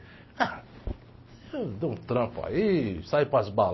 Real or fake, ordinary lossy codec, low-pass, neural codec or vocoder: real; MP3, 24 kbps; 7.2 kHz; none